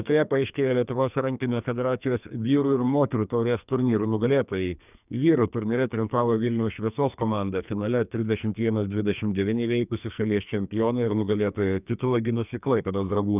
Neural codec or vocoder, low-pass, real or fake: codec, 44.1 kHz, 2.6 kbps, SNAC; 3.6 kHz; fake